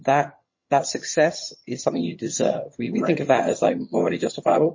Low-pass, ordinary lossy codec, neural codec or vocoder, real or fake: 7.2 kHz; MP3, 32 kbps; vocoder, 22.05 kHz, 80 mel bands, HiFi-GAN; fake